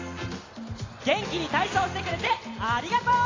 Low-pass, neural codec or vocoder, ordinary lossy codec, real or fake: 7.2 kHz; none; AAC, 32 kbps; real